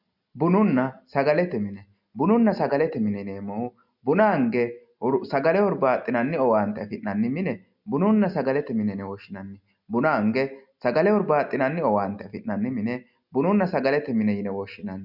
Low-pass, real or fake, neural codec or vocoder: 5.4 kHz; real; none